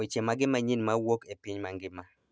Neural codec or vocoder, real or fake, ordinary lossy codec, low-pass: none; real; none; none